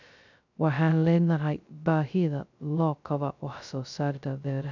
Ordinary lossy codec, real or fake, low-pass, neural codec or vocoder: none; fake; 7.2 kHz; codec, 16 kHz, 0.2 kbps, FocalCodec